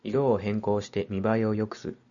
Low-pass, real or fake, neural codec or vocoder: 7.2 kHz; real; none